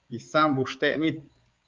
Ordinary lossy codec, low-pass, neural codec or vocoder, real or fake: Opus, 32 kbps; 7.2 kHz; codec, 16 kHz, 4 kbps, X-Codec, HuBERT features, trained on balanced general audio; fake